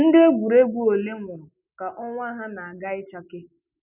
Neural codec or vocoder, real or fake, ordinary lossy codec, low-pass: none; real; none; 3.6 kHz